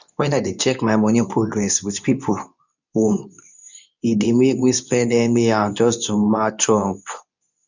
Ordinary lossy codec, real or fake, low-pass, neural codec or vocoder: none; fake; 7.2 kHz; codec, 24 kHz, 0.9 kbps, WavTokenizer, medium speech release version 2